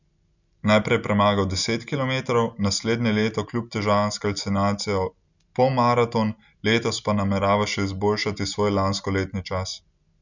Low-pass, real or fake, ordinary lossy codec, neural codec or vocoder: 7.2 kHz; real; none; none